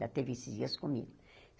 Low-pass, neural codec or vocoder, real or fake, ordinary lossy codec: none; none; real; none